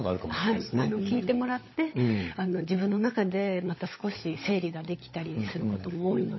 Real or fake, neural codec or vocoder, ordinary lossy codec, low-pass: fake; codec, 16 kHz, 16 kbps, FunCodec, trained on LibriTTS, 50 frames a second; MP3, 24 kbps; 7.2 kHz